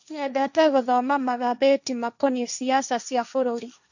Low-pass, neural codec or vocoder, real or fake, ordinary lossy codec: 7.2 kHz; codec, 16 kHz, 1.1 kbps, Voila-Tokenizer; fake; none